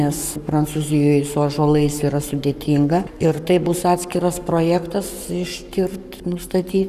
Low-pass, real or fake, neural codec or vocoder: 14.4 kHz; fake; codec, 44.1 kHz, 7.8 kbps, Pupu-Codec